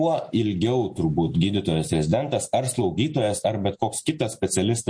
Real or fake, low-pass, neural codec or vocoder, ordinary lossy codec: real; 9.9 kHz; none; MP3, 48 kbps